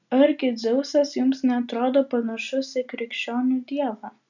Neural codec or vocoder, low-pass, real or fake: none; 7.2 kHz; real